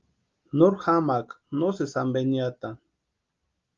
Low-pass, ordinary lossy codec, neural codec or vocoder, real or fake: 7.2 kHz; Opus, 32 kbps; none; real